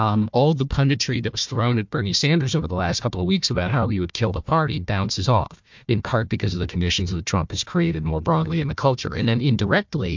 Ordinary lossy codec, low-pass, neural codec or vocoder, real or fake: MP3, 64 kbps; 7.2 kHz; codec, 16 kHz, 1 kbps, FunCodec, trained on Chinese and English, 50 frames a second; fake